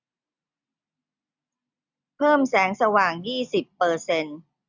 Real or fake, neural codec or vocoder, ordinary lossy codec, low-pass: real; none; none; 7.2 kHz